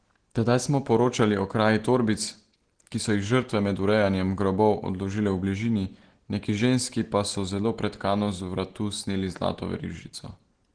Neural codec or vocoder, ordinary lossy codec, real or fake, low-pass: none; Opus, 16 kbps; real; 9.9 kHz